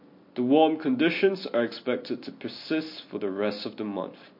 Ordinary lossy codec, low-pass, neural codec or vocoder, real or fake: MP3, 32 kbps; 5.4 kHz; none; real